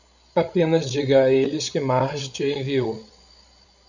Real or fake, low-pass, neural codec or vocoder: fake; 7.2 kHz; codec, 16 kHz, 16 kbps, FreqCodec, larger model